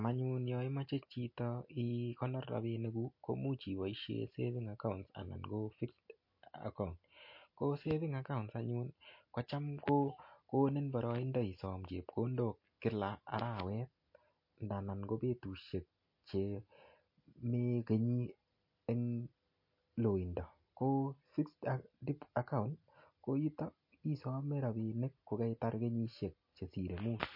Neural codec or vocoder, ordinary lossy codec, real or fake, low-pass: none; MP3, 32 kbps; real; 5.4 kHz